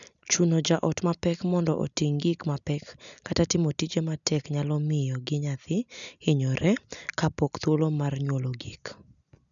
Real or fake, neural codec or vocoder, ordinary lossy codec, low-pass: real; none; none; 7.2 kHz